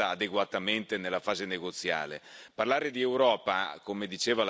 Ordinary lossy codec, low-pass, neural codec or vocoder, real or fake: none; none; none; real